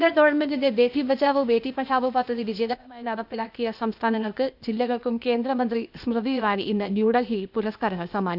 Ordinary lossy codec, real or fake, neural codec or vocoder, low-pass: none; fake; codec, 16 kHz, 0.8 kbps, ZipCodec; 5.4 kHz